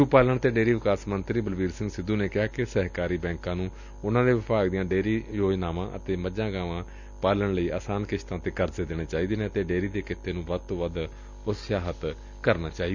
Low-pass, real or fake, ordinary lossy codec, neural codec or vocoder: 7.2 kHz; real; none; none